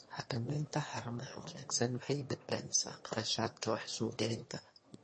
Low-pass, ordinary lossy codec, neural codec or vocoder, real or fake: 9.9 kHz; MP3, 32 kbps; autoencoder, 22.05 kHz, a latent of 192 numbers a frame, VITS, trained on one speaker; fake